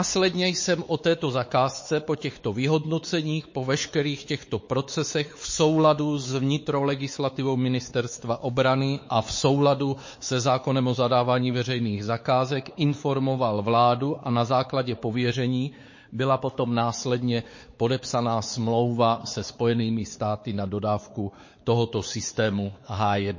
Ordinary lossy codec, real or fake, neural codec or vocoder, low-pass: MP3, 32 kbps; fake; codec, 16 kHz, 4 kbps, X-Codec, WavLM features, trained on Multilingual LibriSpeech; 7.2 kHz